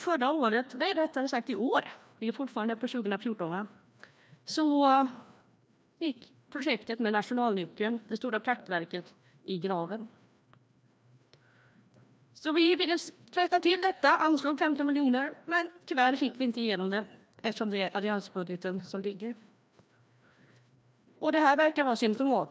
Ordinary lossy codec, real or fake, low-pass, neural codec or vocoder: none; fake; none; codec, 16 kHz, 1 kbps, FreqCodec, larger model